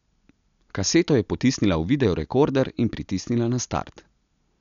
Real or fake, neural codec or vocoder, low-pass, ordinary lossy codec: real; none; 7.2 kHz; none